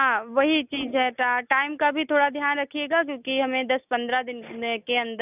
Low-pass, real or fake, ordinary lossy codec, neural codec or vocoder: 3.6 kHz; real; none; none